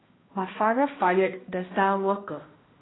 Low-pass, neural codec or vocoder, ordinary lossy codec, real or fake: 7.2 kHz; codec, 16 kHz, 1 kbps, X-Codec, HuBERT features, trained on balanced general audio; AAC, 16 kbps; fake